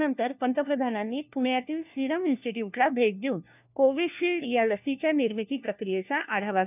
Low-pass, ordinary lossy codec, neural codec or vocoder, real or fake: 3.6 kHz; none; codec, 16 kHz, 1 kbps, FunCodec, trained on LibriTTS, 50 frames a second; fake